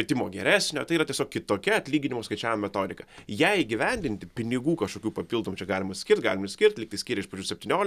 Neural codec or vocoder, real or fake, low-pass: none; real; 14.4 kHz